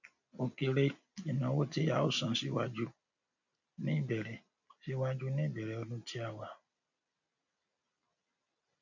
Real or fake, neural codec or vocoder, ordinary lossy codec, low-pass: real; none; none; 7.2 kHz